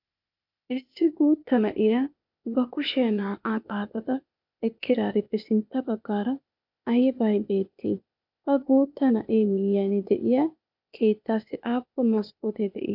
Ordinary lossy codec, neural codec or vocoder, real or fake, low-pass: MP3, 48 kbps; codec, 16 kHz, 0.8 kbps, ZipCodec; fake; 5.4 kHz